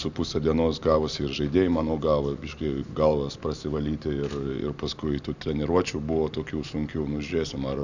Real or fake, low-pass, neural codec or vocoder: real; 7.2 kHz; none